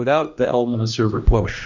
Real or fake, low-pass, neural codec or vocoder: fake; 7.2 kHz; codec, 16 kHz, 1 kbps, X-Codec, HuBERT features, trained on general audio